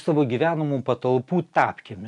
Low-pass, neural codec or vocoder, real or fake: 10.8 kHz; none; real